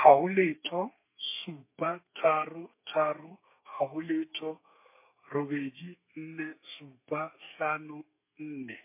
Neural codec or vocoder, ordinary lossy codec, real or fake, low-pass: codec, 32 kHz, 1.9 kbps, SNAC; MP3, 24 kbps; fake; 3.6 kHz